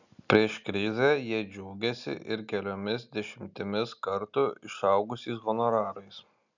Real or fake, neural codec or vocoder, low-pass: real; none; 7.2 kHz